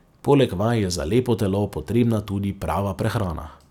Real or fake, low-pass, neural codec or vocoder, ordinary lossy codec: real; 19.8 kHz; none; none